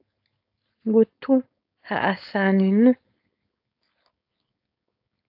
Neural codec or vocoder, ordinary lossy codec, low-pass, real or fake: codec, 16 kHz, 4.8 kbps, FACodec; AAC, 48 kbps; 5.4 kHz; fake